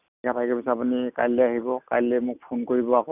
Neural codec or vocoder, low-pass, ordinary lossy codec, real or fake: none; 3.6 kHz; none; real